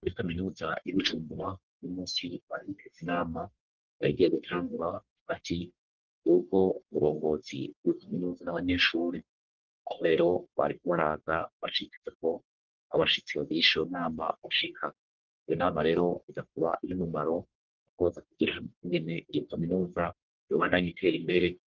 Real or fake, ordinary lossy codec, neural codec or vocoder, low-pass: fake; Opus, 16 kbps; codec, 44.1 kHz, 1.7 kbps, Pupu-Codec; 7.2 kHz